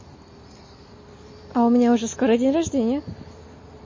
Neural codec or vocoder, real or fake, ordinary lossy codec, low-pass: none; real; MP3, 32 kbps; 7.2 kHz